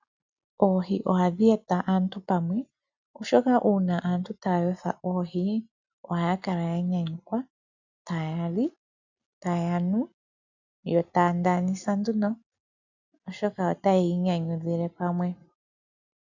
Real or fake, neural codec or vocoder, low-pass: real; none; 7.2 kHz